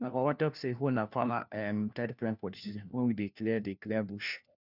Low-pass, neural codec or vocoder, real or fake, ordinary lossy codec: 5.4 kHz; codec, 16 kHz, 1 kbps, FunCodec, trained on LibriTTS, 50 frames a second; fake; none